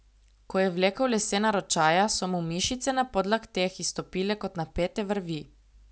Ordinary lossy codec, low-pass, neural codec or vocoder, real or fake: none; none; none; real